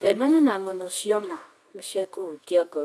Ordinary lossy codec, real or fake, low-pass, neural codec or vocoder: none; fake; none; codec, 24 kHz, 0.9 kbps, WavTokenizer, medium music audio release